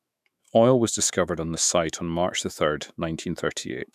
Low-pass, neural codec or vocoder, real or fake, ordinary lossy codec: 14.4 kHz; autoencoder, 48 kHz, 128 numbers a frame, DAC-VAE, trained on Japanese speech; fake; none